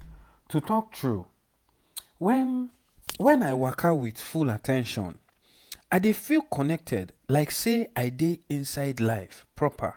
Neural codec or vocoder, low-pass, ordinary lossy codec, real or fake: vocoder, 48 kHz, 128 mel bands, Vocos; none; none; fake